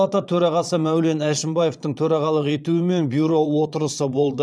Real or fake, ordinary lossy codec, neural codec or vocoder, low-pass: fake; none; vocoder, 22.05 kHz, 80 mel bands, Vocos; none